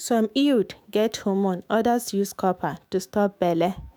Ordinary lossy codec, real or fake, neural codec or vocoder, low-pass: none; fake; autoencoder, 48 kHz, 128 numbers a frame, DAC-VAE, trained on Japanese speech; none